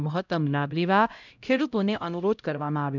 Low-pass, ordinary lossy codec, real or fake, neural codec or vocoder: 7.2 kHz; none; fake; codec, 16 kHz, 0.5 kbps, X-Codec, HuBERT features, trained on LibriSpeech